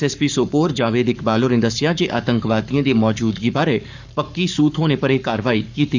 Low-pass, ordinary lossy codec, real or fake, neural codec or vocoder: 7.2 kHz; none; fake; codec, 44.1 kHz, 7.8 kbps, Pupu-Codec